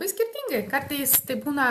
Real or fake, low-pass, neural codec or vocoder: real; 14.4 kHz; none